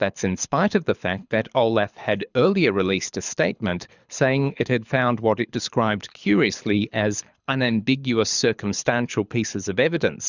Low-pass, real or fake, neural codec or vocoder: 7.2 kHz; fake; codec, 24 kHz, 6 kbps, HILCodec